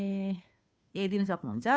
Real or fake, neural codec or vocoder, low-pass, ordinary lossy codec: fake; codec, 16 kHz, 2 kbps, FunCodec, trained on Chinese and English, 25 frames a second; none; none